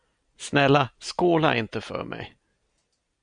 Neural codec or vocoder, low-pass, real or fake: none; 9.9 kHz; real